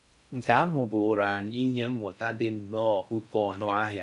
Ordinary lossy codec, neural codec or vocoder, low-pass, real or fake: none; codec, 16 kHz in and 24 kHz out, 0.6 kbps, FocalCodec, streaming, 4096 codes; 10.8 kHz; fake